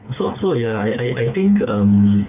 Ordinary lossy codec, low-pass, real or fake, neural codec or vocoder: none; 3.6 kHz; fake; codec, 16 kHz, 4 kbps, FreqCodec, smaller model